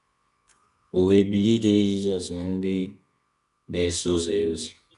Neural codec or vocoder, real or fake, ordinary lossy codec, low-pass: codec, 24 kHz, 0.9 kbps, WavTokenizer, medium music audio release; fake; none; 10.8 kHz